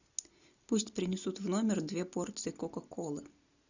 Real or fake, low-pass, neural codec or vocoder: real; 7.2 kHz; none